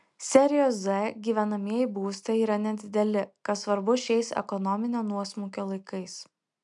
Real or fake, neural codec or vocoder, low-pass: real; none; 10.8 kHz